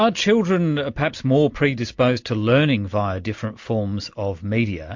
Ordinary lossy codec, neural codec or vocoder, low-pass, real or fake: MP3, 48 kbps; none; 7.2 kHz; real